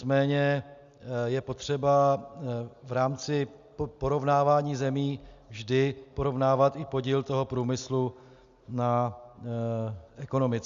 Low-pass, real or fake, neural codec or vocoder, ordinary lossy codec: 7.2 kHz; real; none; Opus, 64 kbps